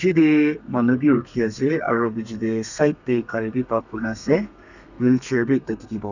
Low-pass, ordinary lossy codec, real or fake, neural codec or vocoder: 7.2 kHz; none; fake; codec, 32 kHz, 1.9 kbps, SNAC